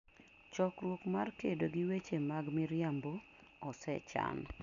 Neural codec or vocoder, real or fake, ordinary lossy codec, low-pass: none; real; none; 7.2 kHz